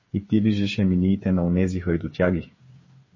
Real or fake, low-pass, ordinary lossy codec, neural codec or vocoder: fake; 7.2 kHz; MP3, 32 kbps; codec, 16 kHz, 8 kbps, FreqCodec, smaller model